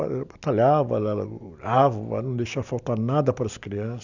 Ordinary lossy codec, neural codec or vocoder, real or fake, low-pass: none; none; real; 7.2 kHz